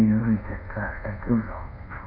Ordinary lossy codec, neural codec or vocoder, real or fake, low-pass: none; codec, 24 kHz, 1.2 kbps, DualCodec; fake; 5.4 kHz